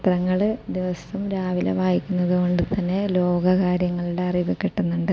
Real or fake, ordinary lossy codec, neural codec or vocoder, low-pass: real; none; none; none